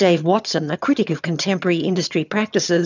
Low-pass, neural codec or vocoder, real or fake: 7.2 kHz; vocoder, 22.05 kHz, 80 mel bands, HiFi-GAN; fake